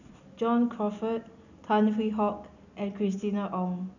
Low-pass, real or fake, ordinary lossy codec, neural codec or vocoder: 7.2 kHz; real; none; none